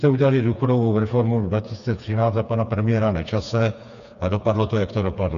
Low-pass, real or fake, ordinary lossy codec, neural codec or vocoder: 7.2 kHz; fake; MP3, 96 kbps; codec, 16 kHz, 4 kbps, FreqCodec, smaller model